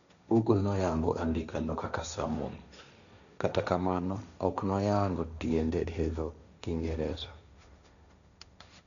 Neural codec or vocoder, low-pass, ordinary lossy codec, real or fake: codec, 16 kHz, 1.1 kbps, Voila-Tokenizer; 7.2 kHz; none; fake